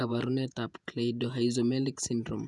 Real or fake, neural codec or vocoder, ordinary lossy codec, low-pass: fake; vocoder, 48 kHz, 128 mel bands, Vocos; none; 10.8 kHz